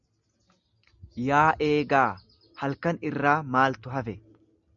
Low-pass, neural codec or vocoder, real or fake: 7.2 kHz; none; real